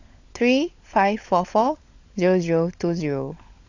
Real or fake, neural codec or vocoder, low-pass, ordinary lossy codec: fake; codec, 16 kHz, 16 kbps, FunCodec, trained on LibriTTS, 50 frames a second; 7.2 kHz; AAC, 48 kbps